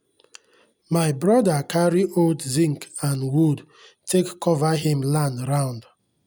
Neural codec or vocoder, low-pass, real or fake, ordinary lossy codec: none; none; real; none